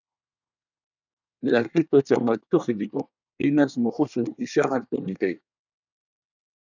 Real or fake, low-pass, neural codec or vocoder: fake; 7.2 kHz; codec, 24 kHz, 1 kbps, SNAC